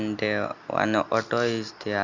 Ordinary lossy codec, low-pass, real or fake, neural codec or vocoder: Opus, 64 kbps; 7.2 kHz; real; none